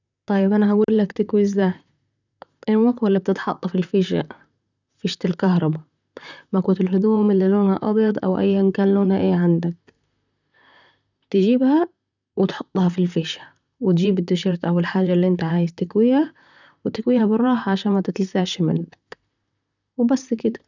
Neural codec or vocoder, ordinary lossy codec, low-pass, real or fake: vocoder, 44.1 kHz, 128 mel bands, Pupu-Vocoder; none; 7.2 kHz; fake